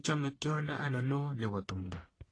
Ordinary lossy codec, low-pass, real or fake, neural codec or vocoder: AAC, 32 kbps; 9.9 kHz; fake; codec, 44.1 kHz, 1.7 kbps, Pupu-Codec